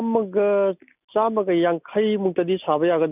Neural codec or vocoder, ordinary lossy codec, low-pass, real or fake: none; none; 3.6 kHz; real